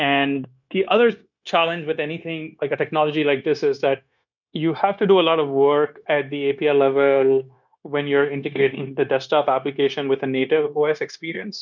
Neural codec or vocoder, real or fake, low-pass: codec, 16 kHz, 0.9 kbps, LongCat-Audio-Codec; fake; 7.2 kHz